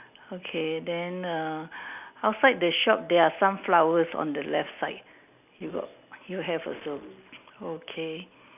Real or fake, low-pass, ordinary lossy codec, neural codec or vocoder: real; 3.6 kHz; none; none